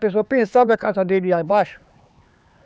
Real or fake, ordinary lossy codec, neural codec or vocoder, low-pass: fake; none; codec, 16 kHz, 4 kbps, X-Codec, HuBERT features, trained on LibriSpeech; none